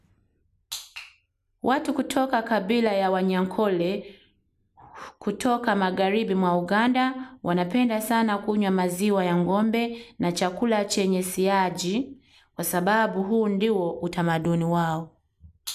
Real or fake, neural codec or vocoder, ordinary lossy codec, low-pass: real; none; none; 14.4 kHz